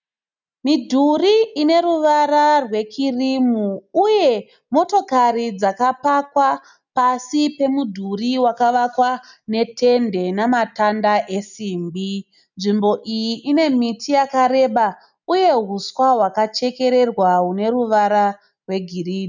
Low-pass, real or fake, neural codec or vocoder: 7.2 kHz; real; none